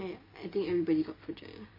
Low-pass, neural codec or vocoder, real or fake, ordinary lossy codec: 5.4 kHz; none; real; MP3, 24 kbps